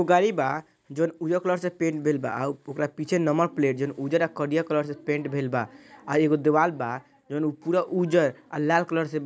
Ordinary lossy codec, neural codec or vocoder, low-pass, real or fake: none; none; none; real